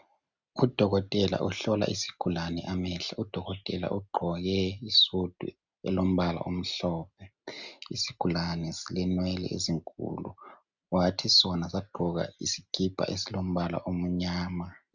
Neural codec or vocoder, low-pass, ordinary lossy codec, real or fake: none; 7.2 kHz; Opus, 64 kbps; real